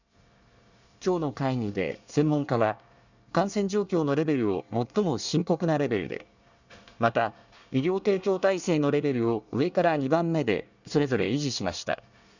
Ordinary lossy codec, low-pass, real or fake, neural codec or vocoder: none; 7.2 kHz; fake; codec, 24 kHz, 1 kbps, SNAC